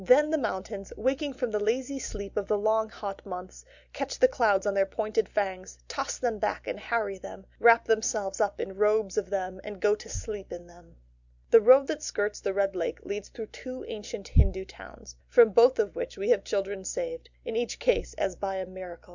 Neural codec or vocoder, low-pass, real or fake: none; 7.2 kHz; real